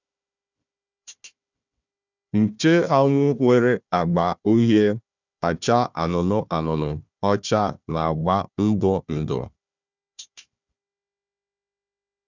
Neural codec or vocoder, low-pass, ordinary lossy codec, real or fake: codec, 16 kHz, 1 kbps, FunCodec, trained on Chinese and English, 50 frames a second; 7.2 kHz; none; fake